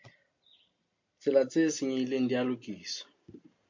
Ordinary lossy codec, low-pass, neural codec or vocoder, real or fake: MP3, 48 kbps; 7.2 kHz; none; real